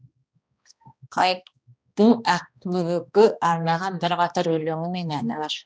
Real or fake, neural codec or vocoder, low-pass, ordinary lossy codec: fake; codec, 16 kHz, 1 kbps, X-Codec, HuBERT features, trained on balanced general audio; none; none